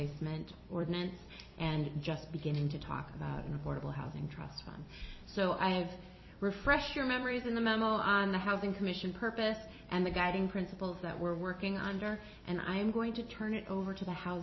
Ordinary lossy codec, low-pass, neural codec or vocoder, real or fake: MP3, 24 kbps; 7.2 kHz; vocoder, 44.1 kHz, 128 mel bands every 256 samples, BigVGAN v2; fake